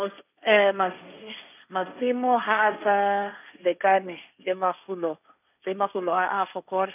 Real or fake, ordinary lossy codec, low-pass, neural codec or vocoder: fake; none; 3.6 kHz; codec, 16 kHz, 1.1 kbps, Voila-Tokenizer